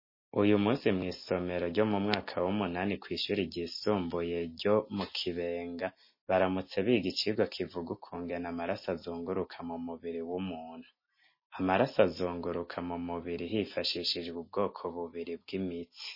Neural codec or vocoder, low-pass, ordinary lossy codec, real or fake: none; 5.4 kHz; MP3, 24 kbps; real